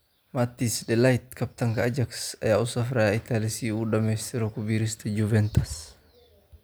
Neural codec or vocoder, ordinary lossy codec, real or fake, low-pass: none; none; real; none